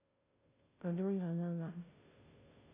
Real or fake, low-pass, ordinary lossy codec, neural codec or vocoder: fake; 3.6 kHz; AAC, 24 kbps; codec, 16 kHz, 0.5 kbps, FunCodec, trained on Chinese and English, 25 frames a second